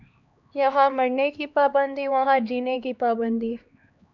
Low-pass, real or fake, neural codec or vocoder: 7.2 kHz; fake; codec, 16 kHz, 2 kbps, X-Codec, HuBERT features, trained on LibriSpeech